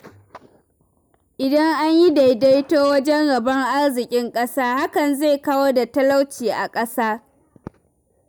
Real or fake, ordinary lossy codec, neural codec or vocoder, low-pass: real; none; none; none